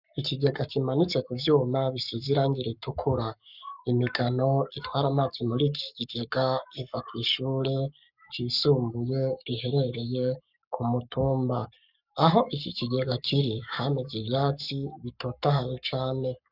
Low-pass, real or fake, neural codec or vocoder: 5.4 kHz; fake; codec, 44.1 kHz, 7.8 kbps, Pupu-Codec